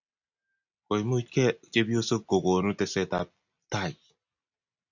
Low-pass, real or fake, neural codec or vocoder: 7.2 kHz; real; none